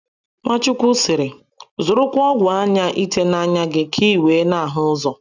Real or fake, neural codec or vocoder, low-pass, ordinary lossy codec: real; none; 7.2 kHz; none